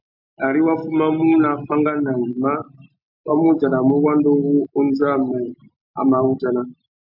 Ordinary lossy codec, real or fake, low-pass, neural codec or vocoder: MP3, 48 kbps; real; 5.4 kHz; none